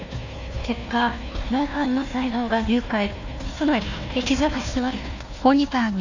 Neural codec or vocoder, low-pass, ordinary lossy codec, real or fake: codec, 16 kHz, 1 kbps, FunCodec, trained on Chinese and English, 50 frames a second; 7.2 kHz; none; fake